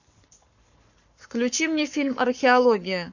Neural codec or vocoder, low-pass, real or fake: codec, 44.1 kHz, 7.8 kbps, Pupu-Codec; 7.2 kHz; fake